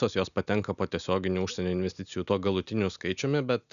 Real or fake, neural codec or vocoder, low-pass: real; none; 7.2 kHz